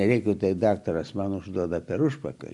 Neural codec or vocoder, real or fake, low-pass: none; real; 10.8 kHz